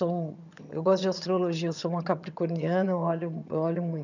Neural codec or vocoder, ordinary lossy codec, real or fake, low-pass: vocoder, 22.05 kHz, 80 mel bands, HiFi-GAN; none; fake; 7.2 kHz